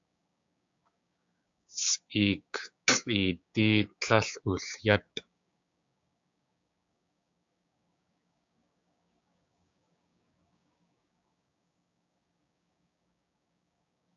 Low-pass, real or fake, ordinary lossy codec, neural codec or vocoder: 7.2 kHz; fake; AAC, 64 kbps; codec, 16 kHz, 6 kbps, DAC